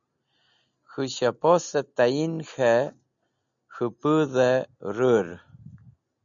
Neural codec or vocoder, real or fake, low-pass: none; real; 7.2 kHz